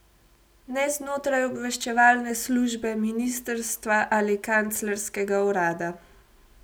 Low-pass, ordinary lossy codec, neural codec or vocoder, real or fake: none; none; none; real